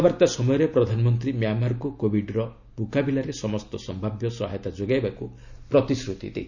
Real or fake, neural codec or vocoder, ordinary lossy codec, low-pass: real; none; none; 7.2 kHz